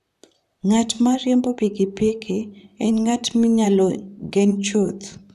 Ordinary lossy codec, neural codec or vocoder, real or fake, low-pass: none; vocoder, 44.1 kHz, 128 mel bands, Pupu-Vocoder; fake; 14.4 kHz